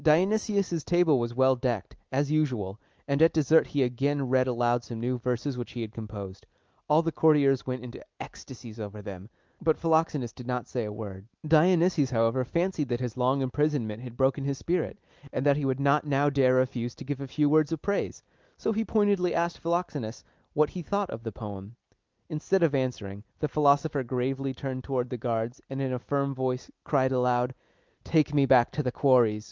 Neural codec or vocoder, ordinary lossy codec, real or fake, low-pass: none; Opus, 24 kbps; real; 7.2 kHz